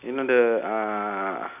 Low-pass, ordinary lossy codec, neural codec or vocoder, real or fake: 3.6 kHz; none; none; real